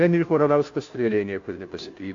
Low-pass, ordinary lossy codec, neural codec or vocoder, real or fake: 7.2 kHz; AAC, 48 kbps; codec, 16 kHz, 0.5 kbps, FunCodec, trained on Chinese and English, 25 frames a second; fake